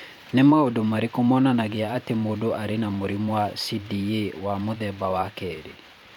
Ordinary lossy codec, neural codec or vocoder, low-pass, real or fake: none; vocoder, 44.1 kHz, 128 mel bands every 512 samples, BigVGAN v2; 19.8 kHz; fake